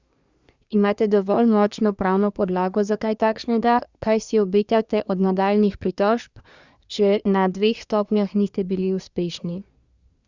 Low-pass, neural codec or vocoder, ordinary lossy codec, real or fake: 7.2 kHz; codec, 24 kHz, 1 kbps, SNAC; Opus, 64 kbps; fake